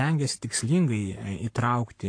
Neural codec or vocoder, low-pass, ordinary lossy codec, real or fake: codec, 44.1 kHz, 7.8 kbps, Pupu-Codec; 9.9 kHz; AAC, 48 kbps; fake